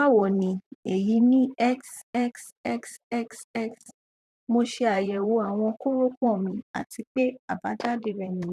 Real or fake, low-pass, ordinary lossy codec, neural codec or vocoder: fake; 14.4 kHz; none; vocoder, 44.1 kHz, 128 mel bands, Pupu-Vocoder